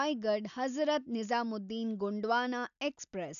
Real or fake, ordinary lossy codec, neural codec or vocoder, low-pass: real; none; none; 7.2 kHz